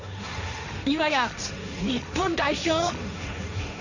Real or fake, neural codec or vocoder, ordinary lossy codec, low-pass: fake; codec, 16 kHz, 1.1 kbps, Voila-Tokenizer; none; 7.2 kHz